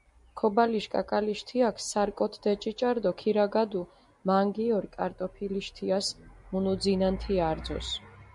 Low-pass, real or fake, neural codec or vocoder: 10.8 kHz; real; none